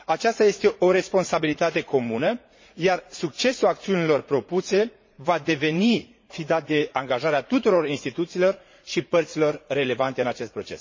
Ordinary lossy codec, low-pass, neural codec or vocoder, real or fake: MP3, 32 kbps; 7.2 kHz; vocoder, 44.1 kHz, 80 mel bands, Vocos; fake